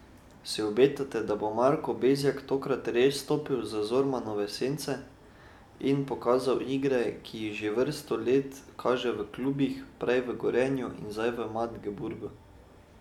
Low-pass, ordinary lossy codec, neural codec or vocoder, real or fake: 19.8 kHz; none; none; real